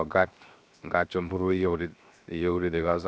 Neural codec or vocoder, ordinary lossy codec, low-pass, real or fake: codec, 16 kHz, 0.7 kbps, FocalCodec; none; none; fake